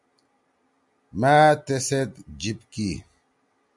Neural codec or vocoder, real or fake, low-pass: none; real; 10.8 kHz